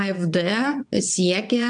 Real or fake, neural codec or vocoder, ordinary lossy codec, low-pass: fake; vocoder, 22.05 kHz, 80 mel bands, WaveNeXt; AAC, 64 kbps; 9.9 kHz